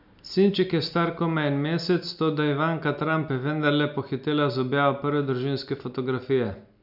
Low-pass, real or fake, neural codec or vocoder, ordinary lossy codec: 5.4 kHz; real; none; none